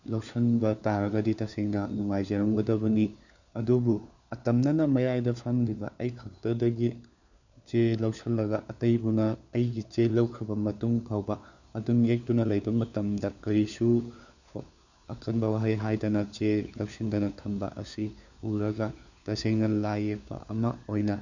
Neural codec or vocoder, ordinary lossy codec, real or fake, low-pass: codec, 16 kHz, 4 kbps, FunCodec, trained on LibriTTS, 50 frames a second; none; fake; 7.2 kHz